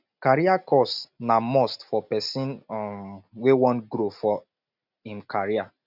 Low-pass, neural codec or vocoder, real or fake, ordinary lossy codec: 5.4 kHz; none; real; none